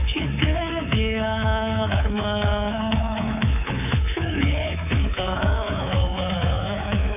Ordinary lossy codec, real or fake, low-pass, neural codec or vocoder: none; fake; 3.6 kHz; codec, 24 kHz, 3.1 kbps, DualCodec